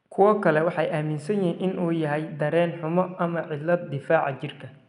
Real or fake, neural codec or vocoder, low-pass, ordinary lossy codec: real; none; 10.8 kHz; none